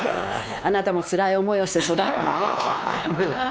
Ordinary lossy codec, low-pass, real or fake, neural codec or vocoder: none; none; fake; codec, 16 kHz, 2 kbps, X-Codec, WavLM features, trained on Multilingual LibriSpeech